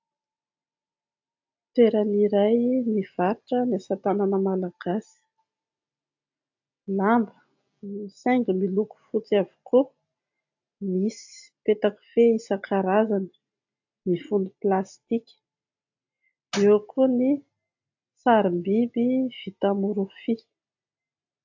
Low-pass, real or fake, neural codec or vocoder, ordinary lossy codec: 7.2 kHz; real; none; AAC, 48 kbps